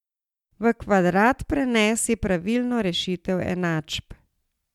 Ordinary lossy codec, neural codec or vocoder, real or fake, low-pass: MP3, 96 kbps; none; real; 19.8 kHz